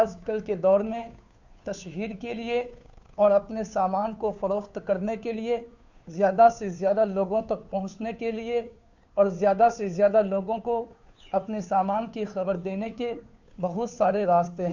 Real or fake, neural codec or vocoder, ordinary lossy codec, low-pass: fake; codec, 16 kHz, 2 kbps, FunCodec, trained on Chinese and English, 25 frames a second; none; 7.2 kHz